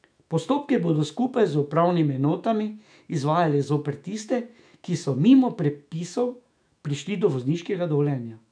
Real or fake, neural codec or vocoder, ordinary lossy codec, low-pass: fake; autoencoder, 48 kHz, 128 numbers a frame, DAC-VAE, trained on Japanese speech; none; 9.9 kHz